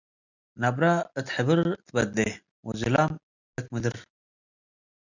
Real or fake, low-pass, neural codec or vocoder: real; 7.2 kHz; none